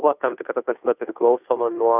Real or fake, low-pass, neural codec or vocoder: fake; 3.6 kHz; codec, 16 kHz, 2 kbps, FunCodec, trained on Chinese and English, 25 frames a second